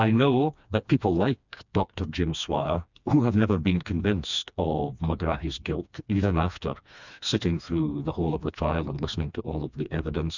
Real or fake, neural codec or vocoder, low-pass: fake; codec, 16 kHz, 2 kbps, FreqCodec, smaller model; 7.2 kHz